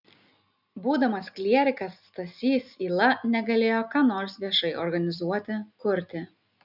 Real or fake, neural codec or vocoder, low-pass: real; none; 5.4 kHz